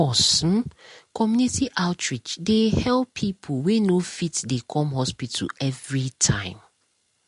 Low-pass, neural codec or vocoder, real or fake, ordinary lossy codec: 10.8 kHz; none; real; MP3, 48 kbps